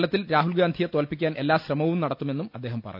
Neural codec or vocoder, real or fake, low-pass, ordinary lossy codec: none; real; 5.4 kHz; none